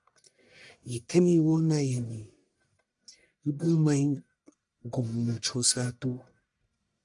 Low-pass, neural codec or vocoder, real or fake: 10.8 kHz; codec, 44.1 kHz, 1.7 kbps, Pupu-Codec; fake